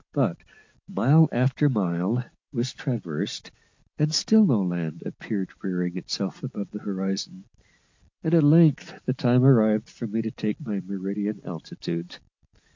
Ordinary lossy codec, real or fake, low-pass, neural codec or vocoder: MP3, 48 kbps; real; 7.2 kHz; none